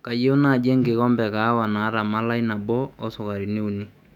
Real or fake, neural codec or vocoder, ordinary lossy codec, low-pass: fake; autoencoder, 48 kHz, 128 numbers a frame, DAC-VAE, trained on Japanese speech; none; 19.8 kHz